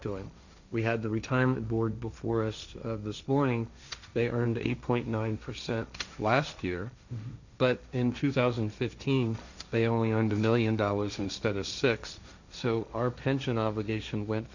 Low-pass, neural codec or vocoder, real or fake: 7.2 kHz; codec, 16 kHz, 1.1 kbps, Voila-Tokenizer; fake